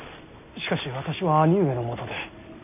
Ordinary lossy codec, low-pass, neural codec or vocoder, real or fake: none; 3.6 kHz; none; real